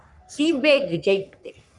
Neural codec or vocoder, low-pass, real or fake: codec, 44.1 kHz, 3.4 kbps, Pupu-Codec; 10.8 kHz; fake